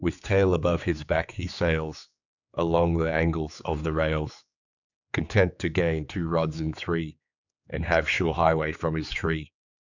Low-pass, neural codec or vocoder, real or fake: 7.2 kHz; codec, 16 kHz, 4 kbps, X-Codec, HuBERT features, trained on general audio; fake